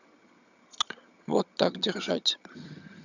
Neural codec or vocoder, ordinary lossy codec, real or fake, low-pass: vocoder, 22.05 kHz, 80 mel bands, HiFi-GAN; none; fake; 7.2 kHz